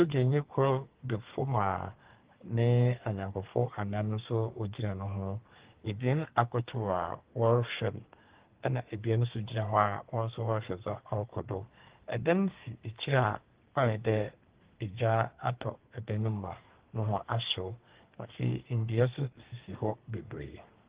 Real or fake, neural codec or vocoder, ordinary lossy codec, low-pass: fake; codec, 32 kHz, 1.9 kbps, SNAC; Opus, 16 kbps; 3.6 kHz